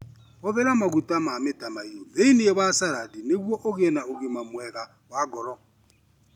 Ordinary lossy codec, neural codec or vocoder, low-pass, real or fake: none; none; 19.8 kHz; real